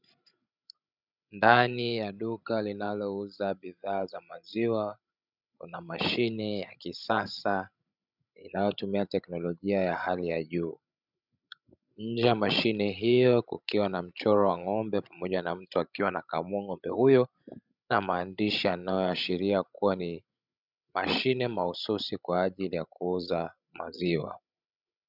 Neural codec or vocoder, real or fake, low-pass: codec, 16 kHz, 16 kbps, FreqCodec, larger model; fake; 5.4 kHz